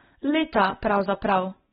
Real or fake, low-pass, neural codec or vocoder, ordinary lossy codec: real; 14.4 kHz; none; AAC, 16 kbps